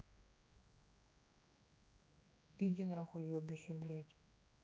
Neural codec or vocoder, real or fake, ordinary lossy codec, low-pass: codec, 16 kHz, 2 kbps, X-Codec, HuBERT features, trained on general audio; fake; none; none